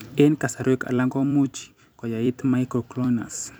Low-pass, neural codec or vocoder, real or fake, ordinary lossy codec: none; vocoder, 44.1 kHz, 128 mel bands every 256 samples, BigVGAN v2; fake; none